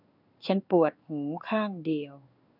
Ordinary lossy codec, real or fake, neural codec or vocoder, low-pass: none; fake; codec, 16 kHz in and 24 kHz out, 1 kbps, XY-Tokenizer; 5.4 kHz